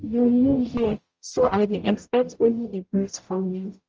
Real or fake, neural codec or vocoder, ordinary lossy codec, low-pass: fake; codec, 44.1 kHz, 0.9 kbps, DAC; Opus, 24 kbps; 7.2 kHz